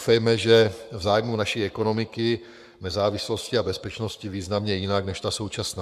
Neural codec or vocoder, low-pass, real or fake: codec, 44.1 kHz, 7.8 kbps, Pupu-Codec; 14.4 kHz; fake